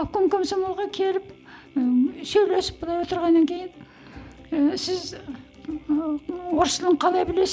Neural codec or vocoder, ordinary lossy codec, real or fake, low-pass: none; none; real; none